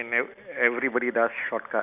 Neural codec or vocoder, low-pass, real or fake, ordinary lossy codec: none; 3.6 kHz; real; none